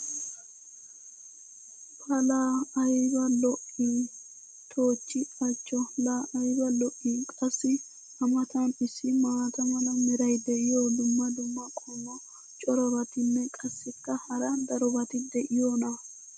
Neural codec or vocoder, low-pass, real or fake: none; 9.9 kHz; real